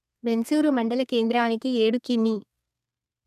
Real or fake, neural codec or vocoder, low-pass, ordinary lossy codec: fake; codec, 32 kHz, 1.9 kbps, SNAC; 14.4 kHz; none